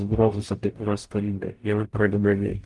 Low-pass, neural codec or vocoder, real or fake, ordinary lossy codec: 10.8 kHz; codec, 44.1 kHz, 0.9 kbps, DAC; fake; Opus, 24 kbps